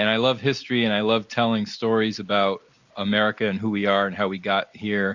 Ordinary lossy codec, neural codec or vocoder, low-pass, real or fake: Opus, 64 kbps; none; 7.2 kHz; real